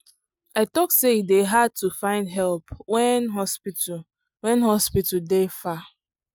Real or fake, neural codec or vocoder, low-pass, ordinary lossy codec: real; none; none; none